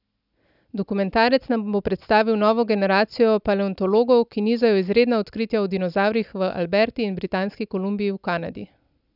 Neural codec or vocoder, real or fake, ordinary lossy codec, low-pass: none; real; none; 5.4 kHz